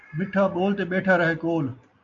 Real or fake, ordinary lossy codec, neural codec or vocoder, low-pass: real; AAC, 64 kbps; none; 7.2 kHz